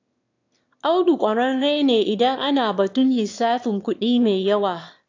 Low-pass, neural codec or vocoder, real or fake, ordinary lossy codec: 7.2 kHz; autoencoder, 22.05 kHz, a latent of 192 numbers a frame, VITS, trained on one speaker; fake; AAC, 48 kbps